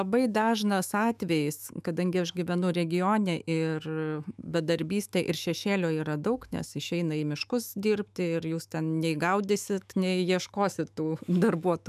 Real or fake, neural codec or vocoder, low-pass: fake; autoencoder, 48 kHz, 128 numbers a frame, DAC-VAE, trained on Japanese speech; 14.4 kHz